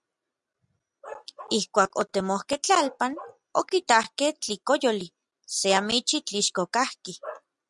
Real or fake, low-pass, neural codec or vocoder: real; 10.8 kHz; none